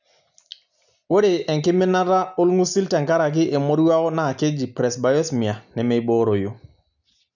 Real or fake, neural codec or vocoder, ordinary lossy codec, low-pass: real; none; none; 7.2 kHz